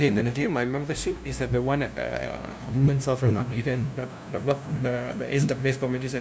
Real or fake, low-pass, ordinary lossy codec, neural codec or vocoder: fake; none; none; codec, 16 kHz, 0.5 kbps, FunCodec, trained on LibriTTS, 25 frames a second